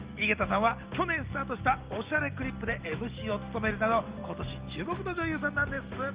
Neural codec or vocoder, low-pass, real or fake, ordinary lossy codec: none; 3.6 kHz; real; Opus, 32 kbps